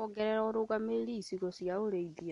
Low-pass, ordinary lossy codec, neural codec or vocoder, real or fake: 10.8 kHz; MP3, 64 kbps; none; real